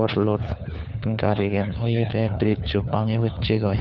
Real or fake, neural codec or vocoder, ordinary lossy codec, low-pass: fake; codec, 16 kHz, 4 kbps, FunCodec, trained on LibriTTS, 50 frames a second; none; 7.2 kHz